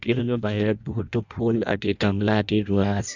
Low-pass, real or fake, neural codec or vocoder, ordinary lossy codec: 7.2 kHz; fake; codec, 16 kHz in and 24 kHz out, 0.6 kbps, FireRedTTS-2 codec; none